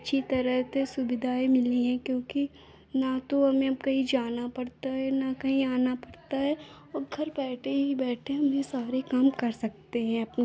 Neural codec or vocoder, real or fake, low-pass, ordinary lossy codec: none; real; none; none